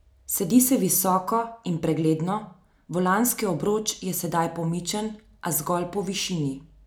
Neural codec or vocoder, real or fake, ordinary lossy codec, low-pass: none; real; none; none